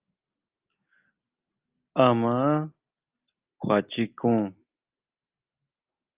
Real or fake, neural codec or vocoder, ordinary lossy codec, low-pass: real; none; Opus, 24 kbps; 3.6 kHz